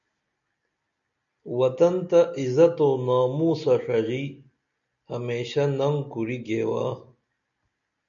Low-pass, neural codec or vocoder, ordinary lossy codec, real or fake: 7.2 kHz; none; MP3, 64 kbps; real